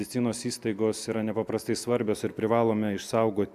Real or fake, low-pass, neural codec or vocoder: real; 14.4 kHz; none